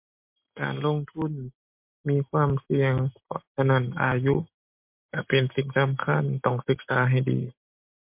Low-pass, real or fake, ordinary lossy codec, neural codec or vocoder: 3.6 kHz; real; MP3, 32 kbps; none